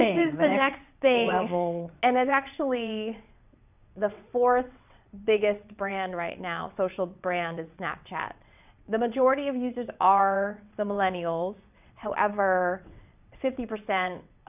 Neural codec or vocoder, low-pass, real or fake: vocoder, 22.05 kHz, 80 mel bands, WaveNeXt; 3.6 kHz; fake